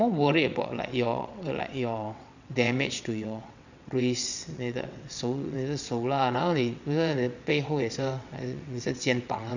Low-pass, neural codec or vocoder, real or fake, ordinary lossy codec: 7.2 kHz; vocoder, 22.05 kHz, 80 mel bands, WaveNeXt; fake; none